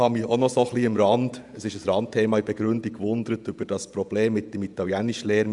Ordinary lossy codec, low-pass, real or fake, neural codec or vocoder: none; 10.8 kHz; fake; vocoder, 48 kHz, 128 mel bands, Vocos